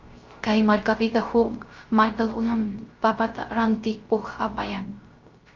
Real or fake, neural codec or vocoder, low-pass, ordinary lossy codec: fake; codec, 16 kHz, 0.3 kbps, FocalCodec; 7.2 kHz; Opus, 24 kbps